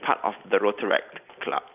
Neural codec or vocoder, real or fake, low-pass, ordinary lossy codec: none; real; 3.6 kHz; none